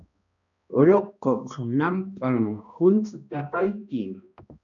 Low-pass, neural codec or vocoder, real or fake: 7.2 kHz; codec, 16 kHz, 1 kbps, X-Codec, HuBERT features, trained on balanced general audio; fake